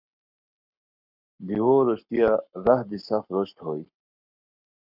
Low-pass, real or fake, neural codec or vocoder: 5.4 kHz; fake; codec, 44.1 kHz, 7.8 kbps, Pupu-Codec